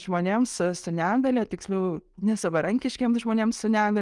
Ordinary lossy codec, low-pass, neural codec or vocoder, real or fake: Opus, 24 kbps; 10.8 kHz; none; real